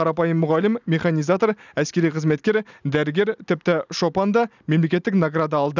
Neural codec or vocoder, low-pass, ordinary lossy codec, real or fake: none; 7.2 kHz; none; real